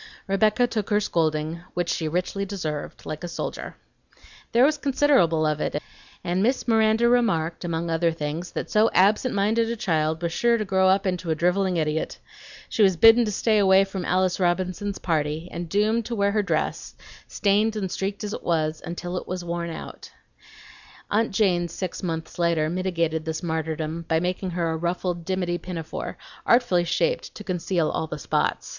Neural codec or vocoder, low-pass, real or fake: none; 7.2 kHz; real